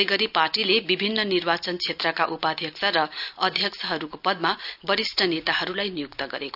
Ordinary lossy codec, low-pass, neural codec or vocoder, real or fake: none; 5.4 kHz; none; real